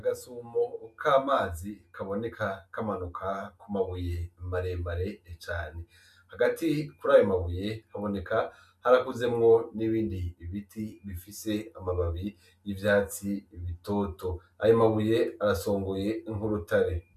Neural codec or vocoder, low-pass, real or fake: none; 14.4 kHz; real